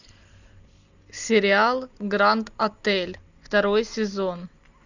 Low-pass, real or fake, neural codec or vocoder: 7.2 kHz; real; none